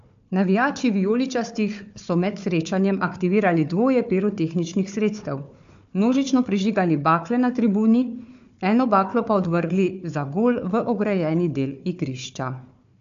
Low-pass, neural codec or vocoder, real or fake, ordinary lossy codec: 7.2 kHz; codec, 16 kHz, 4 kbps, FunCodec, trained on Chinese and English, 50 frames a second; fake; AAC, 64 kbps